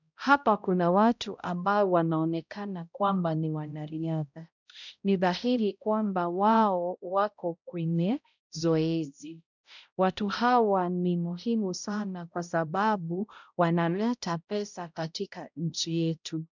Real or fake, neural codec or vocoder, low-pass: fake; codec, 16 kHz, 0.5 kbps, X-Codec, HuBERT features, trained on balanced general audio; 7.2 kHz